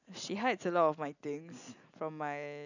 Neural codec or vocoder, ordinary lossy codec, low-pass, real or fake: none; none; 7.2 kHz; real